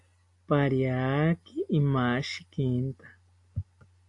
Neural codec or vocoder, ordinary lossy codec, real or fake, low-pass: none; AAC, 64 kbps; real; 10.8 kHz